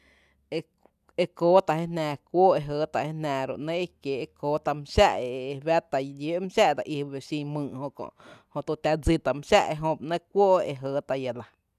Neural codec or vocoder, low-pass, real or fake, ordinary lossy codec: none; 14.4 kHz; real; none